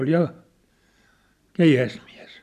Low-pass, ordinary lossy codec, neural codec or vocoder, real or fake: 14.4 kHz; none; none; real